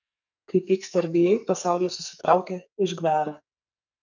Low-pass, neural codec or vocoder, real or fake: 7.2 kHz; codec, 44.1 kHz, 2.6 kbps, SNAC; fake